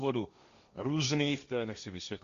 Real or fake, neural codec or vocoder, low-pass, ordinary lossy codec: fake; codec, 16 kHz, 1.1 kbps, Voila-Tokenizer; 7.2 kHz; Opus, 64 kbps